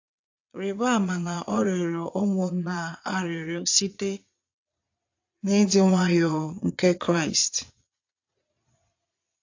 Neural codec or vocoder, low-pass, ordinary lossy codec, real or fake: vocoder, 22.05 kHz, 80 mel bands, Vocos; 7.2 kHz; AAC, 48 kbps; fake